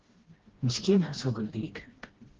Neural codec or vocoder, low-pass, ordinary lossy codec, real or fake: codec, 16 kHz, 1 kbps, FreqCodec, smaller model; 7.2 kHz; Opus, 16 kbps; fake